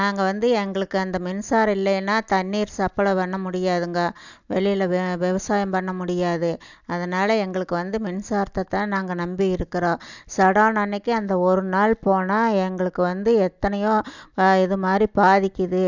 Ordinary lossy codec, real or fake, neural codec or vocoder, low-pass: none; real; none; 7.2 kHz